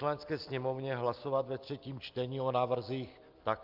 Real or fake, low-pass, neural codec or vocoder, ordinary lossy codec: real; 5.4 kHz; none; Opus, 32 kbps